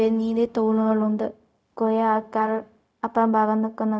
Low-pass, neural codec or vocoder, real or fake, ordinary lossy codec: none; codec, 16 kHz, 0.4 kbps, LongCat-Audio-Codec; fake; none